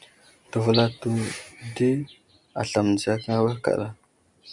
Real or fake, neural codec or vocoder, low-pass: real; none; 10.8 kHz